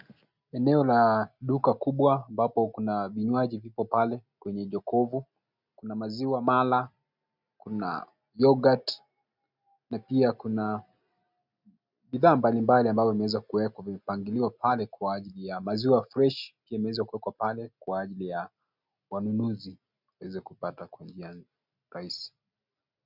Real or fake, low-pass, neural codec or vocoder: real; 5.4 kHz; none